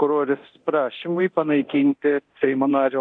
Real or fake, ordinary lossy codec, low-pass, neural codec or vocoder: fake; AAC, 64 kbps; 9.9 kHz; codec, 24 kHz, 0.9 kbps, DualCodec